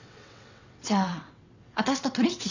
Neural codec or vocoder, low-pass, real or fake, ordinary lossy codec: vocoder, 22.05 kHz, 80 mel bands, WaveNeXt; 7.2 kHz; fake; none